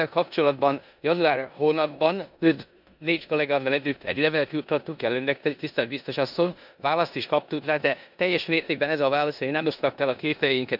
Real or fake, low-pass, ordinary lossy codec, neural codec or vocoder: fake; 5.4 kHz; none; codec, 16 kHz in and 24 kHz out, 0.9 kbps, LongCat-Audio-Codec, four codebook decoder